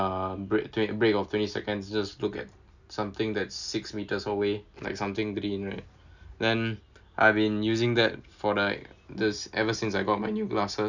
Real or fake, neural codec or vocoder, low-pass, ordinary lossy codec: real; none; 7.2 kHz; none